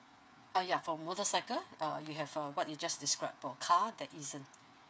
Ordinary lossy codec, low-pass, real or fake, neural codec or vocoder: none; none; fake; codec, 16 kHz, 8 kbps, FreqCodec, smaller model